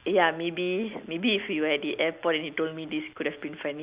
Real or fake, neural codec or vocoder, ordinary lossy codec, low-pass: real; none; Opus, 64 kbps; 3.6 kHz